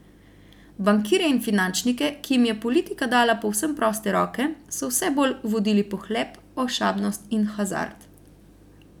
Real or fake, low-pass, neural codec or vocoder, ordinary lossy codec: real; 19.8 kHz; none; none